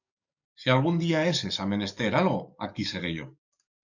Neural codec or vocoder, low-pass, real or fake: codec, 44.1 kHz, 7.8 kbps, DAC; 7.2 kHz; fake